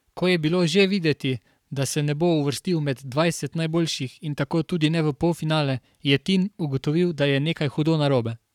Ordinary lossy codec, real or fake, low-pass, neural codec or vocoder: none; fake; 19.8 kHz; codec, 44.1 kHz, 7.8 kbps, Pupu-Codec